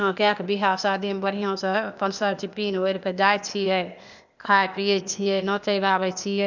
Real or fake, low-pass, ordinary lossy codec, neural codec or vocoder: fake; 7.2 kHz; none; codec, 16 kHz, 0.8 kbps, ZipCodec